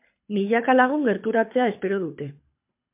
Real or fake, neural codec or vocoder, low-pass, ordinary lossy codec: fake; codec, 24 kHz, 6 kbps, HILCodec; 3.6 kHz; MP3, 32 kbps